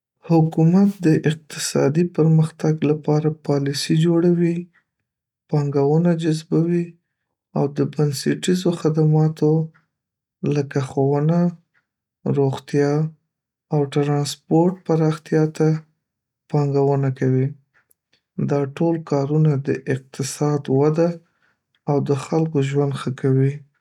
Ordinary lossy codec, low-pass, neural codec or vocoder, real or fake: none; 19.8 kHz; none; real